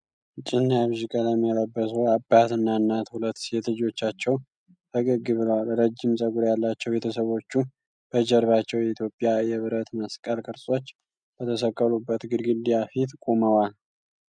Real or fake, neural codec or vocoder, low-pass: real; none; 9.9 kHz